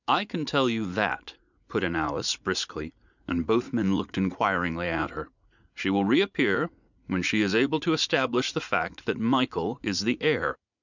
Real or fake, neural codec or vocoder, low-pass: fake; vocoder, 44.1 kHz, 80 mel bands, Vocos; 7.2 kHz